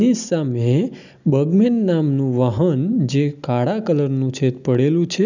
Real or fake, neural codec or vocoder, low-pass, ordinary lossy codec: real; none; 7.2 kHz; none